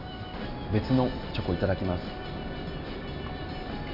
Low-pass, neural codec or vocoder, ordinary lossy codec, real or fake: 5.4 kHz; none; none; real